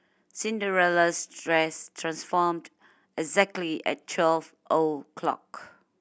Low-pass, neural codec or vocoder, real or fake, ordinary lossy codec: none; none; real; none